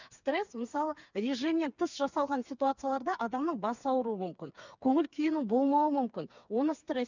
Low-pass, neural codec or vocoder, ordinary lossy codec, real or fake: 7.2 kHz; codec, 16 kHz, 4 kbps, FreqCodec, smaller model; none; fake